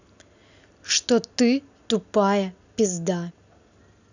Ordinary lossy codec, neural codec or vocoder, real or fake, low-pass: none; none; real; 7.2 kHz